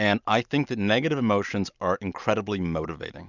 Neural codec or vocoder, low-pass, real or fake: none; 7.2 kHz; real